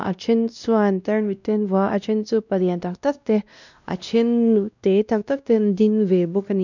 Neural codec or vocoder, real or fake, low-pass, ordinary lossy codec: codec, 16 kHz, 1 kbps, X-Codec, WavLM features, trained on Multilingual LibriSpeech; fake; 7.2 kHz; none